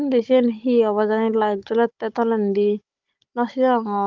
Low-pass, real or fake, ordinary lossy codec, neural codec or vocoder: 7.2 kHz; fake; Opus, 32 kbps; codec, 16 kHz, 16 kbps, FunCodec, trained on Chinese and English, 50 frames a second